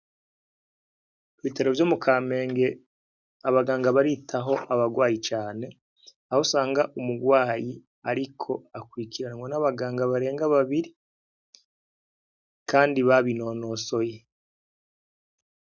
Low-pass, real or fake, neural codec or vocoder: 7.2 kHz; real; none